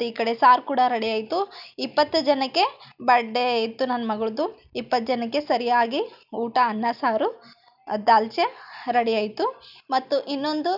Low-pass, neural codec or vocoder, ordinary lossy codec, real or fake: 5.4 kHz; none; none; real